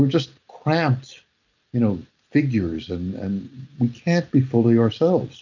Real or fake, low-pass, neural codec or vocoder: real; 7.2 kHz; none